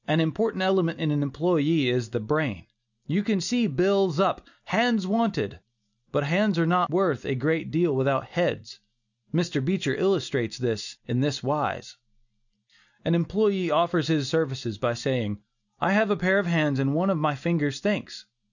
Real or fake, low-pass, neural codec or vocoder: real; 7.2 kHz; none